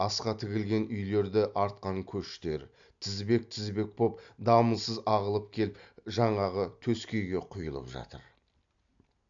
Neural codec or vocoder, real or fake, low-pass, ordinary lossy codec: none; real; 7.2 kHz; AAC, 64 kbps